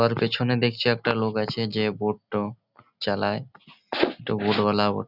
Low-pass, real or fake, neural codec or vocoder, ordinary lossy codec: 5.4 kHz; real; none; none